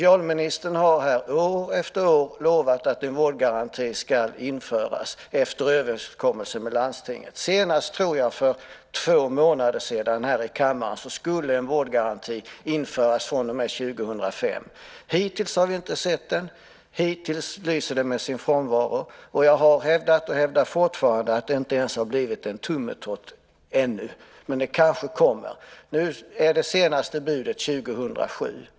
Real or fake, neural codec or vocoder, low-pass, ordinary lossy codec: real; none; none; none